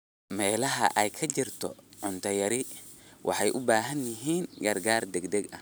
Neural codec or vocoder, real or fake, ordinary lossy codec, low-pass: none; real; none; none